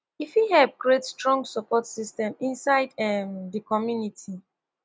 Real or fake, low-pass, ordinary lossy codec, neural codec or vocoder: real; none; none; none